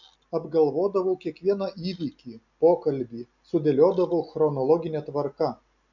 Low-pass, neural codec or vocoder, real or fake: 7.2 kHz; none; real